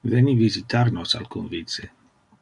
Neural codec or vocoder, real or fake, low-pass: none; real; 10.8 kHz